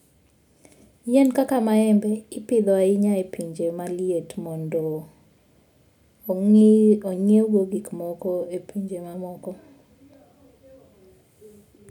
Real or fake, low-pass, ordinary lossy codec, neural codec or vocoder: real; 19.8 kHz; none; none